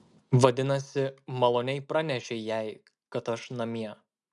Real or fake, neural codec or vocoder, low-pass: real; none; 10.8 kHz